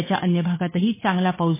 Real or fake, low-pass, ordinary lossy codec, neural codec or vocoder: fake; 3.6 kHz; MP3, 16 kbps; codec, 16 kHz, 8 kbps, FunCodec, trained on Chinese and English, 25 frames a second